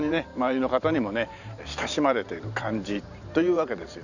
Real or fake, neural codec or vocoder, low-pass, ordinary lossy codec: fake; vocoder, 44.1 kHz, 128 mel bands every 512 samples, BigVGAN v2; 7.2 kHz; none